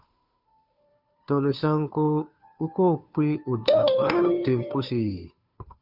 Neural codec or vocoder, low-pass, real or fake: codec, 16 kHz, 2 kbps, FunCodec, trained on Chinese and English, 25 frames a second; 5.4 kHz; fake